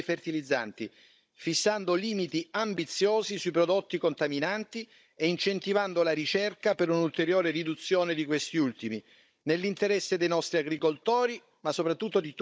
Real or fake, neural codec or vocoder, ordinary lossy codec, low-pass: fake; codec, 16 kHz, 16 kbps, FunCodec, trained on Chinese and English, 50 frames a second; none; none